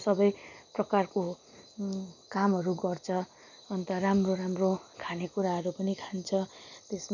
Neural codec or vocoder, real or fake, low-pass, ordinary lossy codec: none; real; 7.2 kHz; none